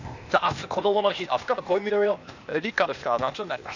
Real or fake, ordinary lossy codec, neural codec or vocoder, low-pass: fake; none; codec, 16 kHz, 0.8 kbps, ZipCodec; 7.2 kHz